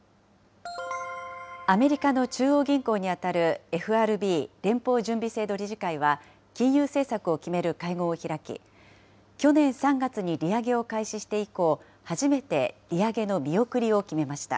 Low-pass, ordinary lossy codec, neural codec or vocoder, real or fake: none; none; none; real